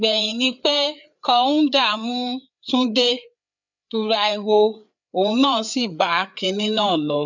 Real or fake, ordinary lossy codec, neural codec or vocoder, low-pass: fake; none; codec, 16 kHz, 4 kbps, FreqCodec, larger model; 7.2 kHz